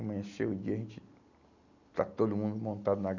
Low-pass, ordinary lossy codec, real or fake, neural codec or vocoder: 7.2 kHz; none; real; none